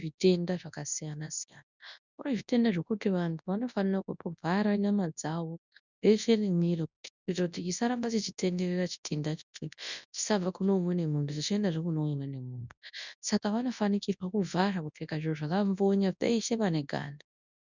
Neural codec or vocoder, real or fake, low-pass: codec, 24 kHz, 0.9 kbps, WavTokenizer, large speech release; fake; 7.2 kHz